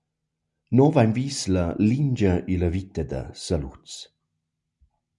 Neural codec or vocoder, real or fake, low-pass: none; real; 10.8 kHz